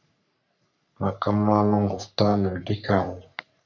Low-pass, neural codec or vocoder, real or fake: 7.2 kHz; codec, 44.1 kHz, 3.4 kbps, Pupu-Codec; fake